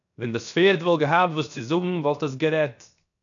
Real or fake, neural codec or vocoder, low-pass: fake; codec, 16 kHz, 0.7 kbps, FocalCodec; 7.2 kHz